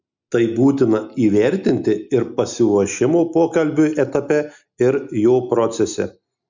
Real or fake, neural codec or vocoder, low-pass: real; none; 7.2 kHz